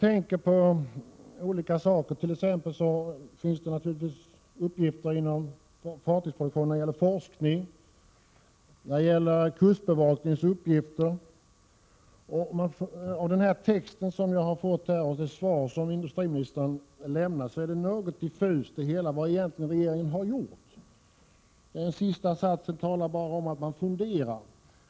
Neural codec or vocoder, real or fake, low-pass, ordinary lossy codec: none; real; none; none